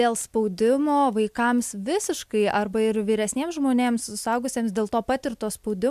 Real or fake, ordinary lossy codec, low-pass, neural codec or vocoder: real; MP3, 96 kbps; 14.4 kHz; none